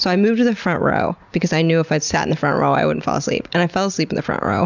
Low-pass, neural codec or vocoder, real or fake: 7.2 kHz; none; real